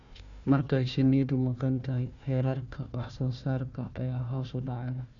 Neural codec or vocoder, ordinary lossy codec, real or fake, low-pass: codec, 16 kHz, 1 kbps, FunCodec, trained on Chinese and English, 50 frames a second; none; fake; 7.2 kHz